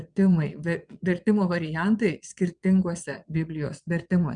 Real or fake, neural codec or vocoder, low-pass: fake; vocoder, 22.05 kHz, 80 mel bands, Vocos; 9.9 kHz